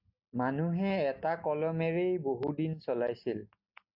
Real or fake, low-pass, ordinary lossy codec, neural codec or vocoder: real; 5.4 kHz; AAC, 48 kbps; none